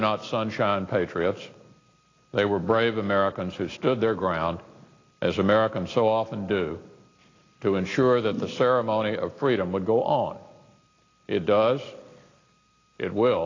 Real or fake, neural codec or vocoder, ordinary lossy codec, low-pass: real; none; AAC, 32 kbps; 7.2 kHz